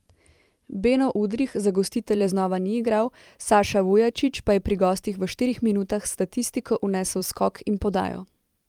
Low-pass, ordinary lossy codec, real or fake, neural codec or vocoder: 19.8 kHz; Opus, 32 kbps; fake; vocoder, 44.1 kHz, 128 mel bands every 512 samples, BigVGAN v2